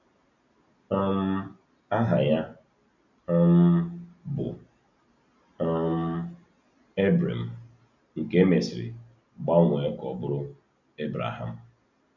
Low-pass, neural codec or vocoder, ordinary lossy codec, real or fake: 7.2 kHz; none; none; real